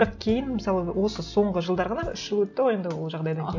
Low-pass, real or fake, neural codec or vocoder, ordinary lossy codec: 7.2 kHz; real; none; none